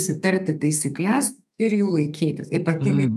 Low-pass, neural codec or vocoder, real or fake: 14.4 kHz; codec, 32 kHz, 1.9 kbps, SNAC; fake